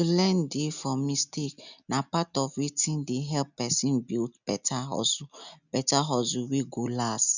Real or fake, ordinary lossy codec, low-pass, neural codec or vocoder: real; none; 7.2 kHz; none